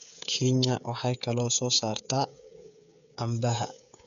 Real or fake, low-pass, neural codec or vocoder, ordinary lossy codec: fake; 7.2 kHz; codec, 16 kHz, 8 kbps, FreqCodec, smaller model; none